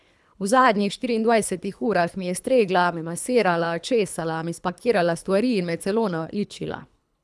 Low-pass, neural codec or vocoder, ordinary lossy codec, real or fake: none; codec, 24 kHz, 3 kbps, HILCodec; none; fake